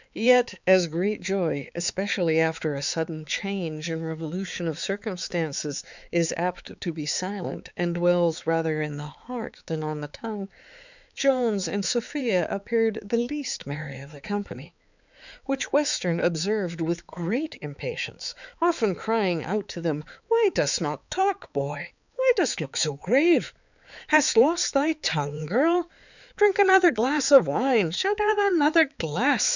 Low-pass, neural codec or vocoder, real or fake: 7.2 kHz; codec, 16 kHz, 4 kbps, X-Codec, HuBERT features, trained on balanced general audio; fake